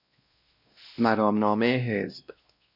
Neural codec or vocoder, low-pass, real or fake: codec, 16 kHz, 1 kbps, X-Codec, WavLM features, trained on Multilingual LibriSpeech; 5.4 kHz; fake